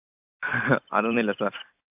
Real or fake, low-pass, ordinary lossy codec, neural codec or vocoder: fake; 3.6 kHz; AAC, 32 kbps; vocoder, 44.1 kHz, 128 mel bands every 256 samples, BigVGAN v2